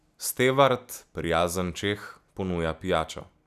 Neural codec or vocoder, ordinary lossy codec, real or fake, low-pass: none; none; real; 14.4 kHz